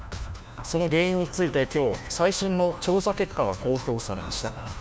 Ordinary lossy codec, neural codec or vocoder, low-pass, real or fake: none; codec, 16 kHz, 1 kbps, FunCodec, trained on LibriTTS, 50 frames a second; none; fake